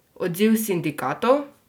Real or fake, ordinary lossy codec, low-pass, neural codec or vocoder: real; none; none; none